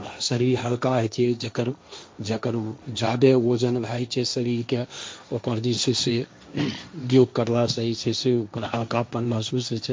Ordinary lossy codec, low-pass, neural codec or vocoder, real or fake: none; none; codec, 16 kHz, 1.1 kbps, Voila-Tokenizer; fake